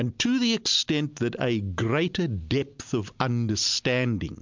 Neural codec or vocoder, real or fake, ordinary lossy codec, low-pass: none; real; MP3, 64 kbps; 7.2 kHz